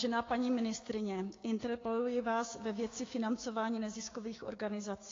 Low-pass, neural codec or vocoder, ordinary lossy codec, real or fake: 7.2 kHz; codec, 16 kHz, 6 kbps, DAC; AAC, 32 kbps; fake